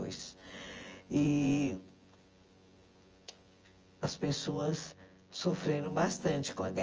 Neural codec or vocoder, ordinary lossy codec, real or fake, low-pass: vocoder, 24 kHz, 100 mel bands, Vocos; Opus, 24 kbps; fake; 7.2 kHz